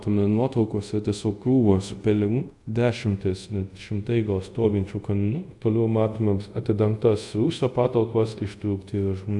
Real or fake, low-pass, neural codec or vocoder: fake; 10.8 kHz; codec, 24 kHz, 0.5 kbps, DualCodec